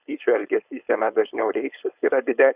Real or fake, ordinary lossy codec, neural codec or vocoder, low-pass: fake; Opus, 64 kbps; codec, 16 kHz, 4.8 kbps, FACodec; 3.6 kHz